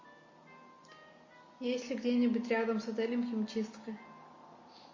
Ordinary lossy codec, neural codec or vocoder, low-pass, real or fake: MP3, 32 kbps; none; 7.2 kHz; real